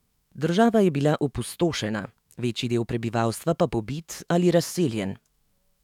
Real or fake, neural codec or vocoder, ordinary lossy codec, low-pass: fake; autoencoder, 48 kHz, 128 numbers a frame, DAC-VAE, trained on Japanese speech; none; 19.8 kHz